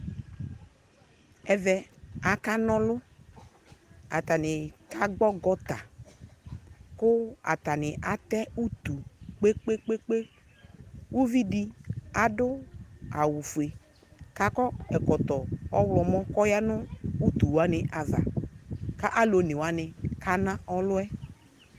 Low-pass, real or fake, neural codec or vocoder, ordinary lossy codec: 14.4 kHz; real; none; Opus, 24 kbps